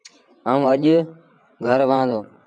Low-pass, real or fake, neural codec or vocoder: 9.9 kHz; fake; vocoder, 22.05 kHz, 80 mel bands, WaveNeXt